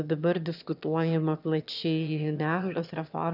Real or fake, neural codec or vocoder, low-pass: fake; autoencoder, 22.05 kHz, a latent of 192 numbers a frame, VITS, trained on one speaker; 5.4 kHz